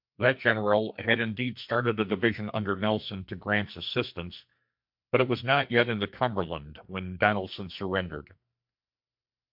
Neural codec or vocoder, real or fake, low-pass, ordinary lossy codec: codec, 44.1 kHz, 2.6 kbps, SNAC; fake; 5.4 kHz; MP3, 48 kbps